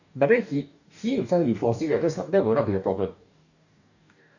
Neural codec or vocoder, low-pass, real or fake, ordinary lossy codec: codec, 44.1 kHz, 2.6 kbps, DAC; 7.2 kHz; fake; none